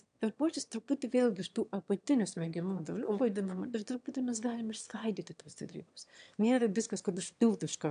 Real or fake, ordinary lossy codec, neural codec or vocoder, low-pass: fake; AAC, 96 kbps; autoencoder, 22.05 kHz, a latent of 192 numbers a frame, VITS, trained on one speaker; 9.9 kHz